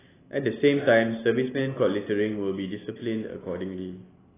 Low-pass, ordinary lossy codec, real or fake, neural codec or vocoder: 3.6 kHz; AAC, 16 kbps; real; none